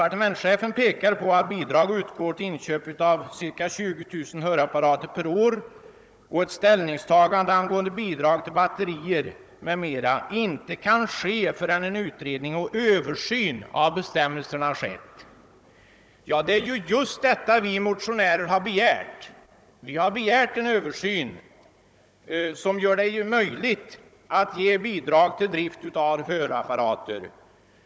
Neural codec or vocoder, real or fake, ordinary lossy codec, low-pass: codec, 16 kHz, 16 kbps, FunCodec, trained on Chinese and English, 50 frames a second; fake; none; none